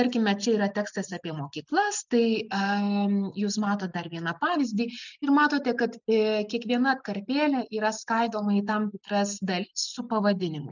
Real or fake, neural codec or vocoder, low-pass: real; none; 7.2 kHz